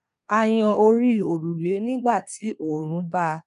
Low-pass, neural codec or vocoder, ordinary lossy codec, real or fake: 10.8 kHz; codec, 24 kHz, 1 kbps, SNAC; none; fake